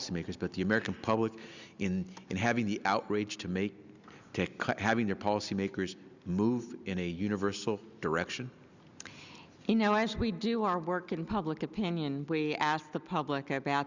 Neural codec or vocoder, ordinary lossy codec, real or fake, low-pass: none; Opus, 64 kbps; real; 7.2 kHz